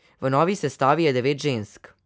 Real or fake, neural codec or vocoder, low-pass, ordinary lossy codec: real; none; none; none